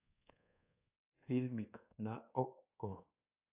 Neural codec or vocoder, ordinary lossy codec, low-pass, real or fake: codec, 24 kHz, 1.2 kbps, DualCodec; AAC, 32 kbps; 3.6 kHz; fake